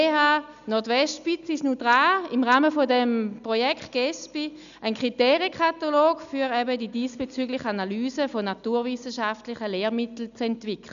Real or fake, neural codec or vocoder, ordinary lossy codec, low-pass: real; none; none; 7.2 kHz